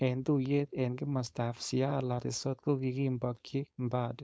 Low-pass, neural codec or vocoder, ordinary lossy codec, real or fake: none; codec, 16 kHz, 4.8 kbps, FACodec; none; fake